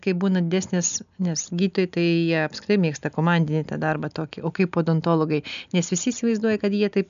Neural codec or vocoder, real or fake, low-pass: none; real; 7.2 kHz